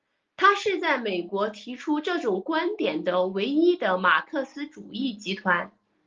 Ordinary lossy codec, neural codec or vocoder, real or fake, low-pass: Opus, 32 kbps; none; real; 7.2 kHz